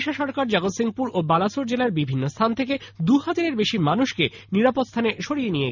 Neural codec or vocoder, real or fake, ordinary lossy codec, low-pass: none; real; none; 7.2 kHz